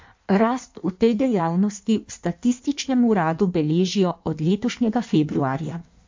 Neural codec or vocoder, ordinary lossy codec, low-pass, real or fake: codec, 16 kHz in and 24 kHz out, 1.1 kbps, FireRedTTS-2 codec; MP3, 64 kbps; 7.2 kHz; fake